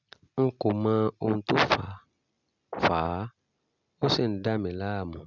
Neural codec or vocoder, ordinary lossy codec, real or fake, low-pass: none; none; real; 7.2 kHz